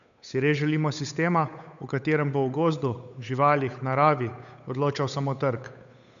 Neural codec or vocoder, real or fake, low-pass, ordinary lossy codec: codec, 16 kHz, 8 kbps, FunCodec, trained on Chinese and English, 25 frames a second; fake; 7.2 kHz; none